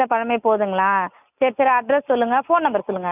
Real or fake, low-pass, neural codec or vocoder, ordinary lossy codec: real; 3.6 kHz; none; none